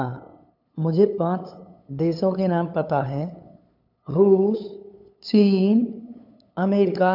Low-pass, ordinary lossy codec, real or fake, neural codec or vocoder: 5.4 kHz; none; fake; codec, 16 kHz, 8 kbps, FunCodec, trained on LibriTTS, 25 frames a second